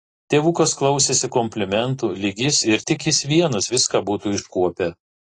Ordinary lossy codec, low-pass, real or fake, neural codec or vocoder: AAC, 32 kbps; 10.8 kHz; real; none